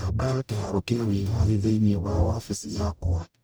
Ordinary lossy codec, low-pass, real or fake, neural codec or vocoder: none; none; fake; codec, 44.1 kHz, 0.9 kbps, DAC